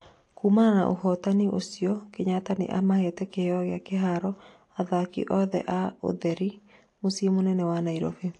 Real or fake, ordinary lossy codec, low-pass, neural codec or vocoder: real; AAC, 48 kbps; 10.8 kHz; none